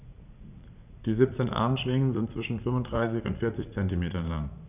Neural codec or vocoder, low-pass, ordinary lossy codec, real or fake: vocoder, 44.1 kHz, 80 mel bands, Vocos; 3.6 kHz; none; fake